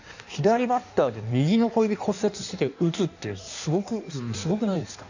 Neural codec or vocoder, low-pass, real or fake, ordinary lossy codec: codec, 16 kHz in and 24 kHz out, 1.1 kbps, FireRedTTS-2 codec; 7.2 kHz; fake; none